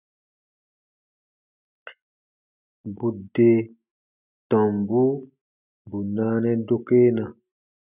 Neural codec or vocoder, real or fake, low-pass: none; real; 3.6 kHz